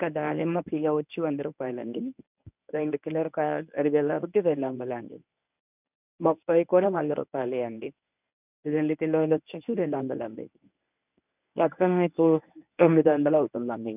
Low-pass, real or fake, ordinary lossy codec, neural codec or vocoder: 3.6 kHz; fake; none; codec, 24 kHz, 0.9 kbps, WavTokenizer, medium speech release version 1